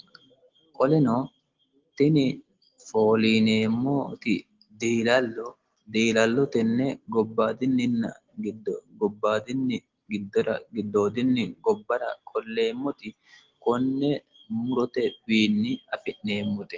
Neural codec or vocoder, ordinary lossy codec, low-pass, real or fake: none; Opus, 16 kbps; 7.2 kHz; real